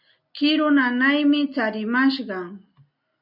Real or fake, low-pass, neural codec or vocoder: real; 5.4 kHz; none